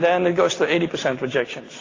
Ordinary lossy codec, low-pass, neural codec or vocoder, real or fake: AAC, 32 kbps; 7.2 kHz; none; real